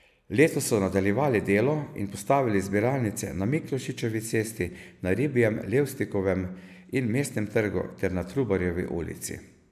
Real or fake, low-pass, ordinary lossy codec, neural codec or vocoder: real; 14.4 kHz; none; none